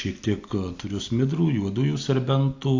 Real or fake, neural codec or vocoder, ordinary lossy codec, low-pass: real; none; AAC, 48 kbps; 7.2 kHz